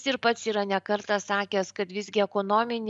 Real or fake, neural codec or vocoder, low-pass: real; none; 10.8 kHz